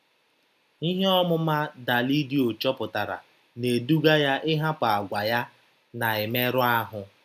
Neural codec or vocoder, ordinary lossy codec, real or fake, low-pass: none; none; real; 14.4 kHz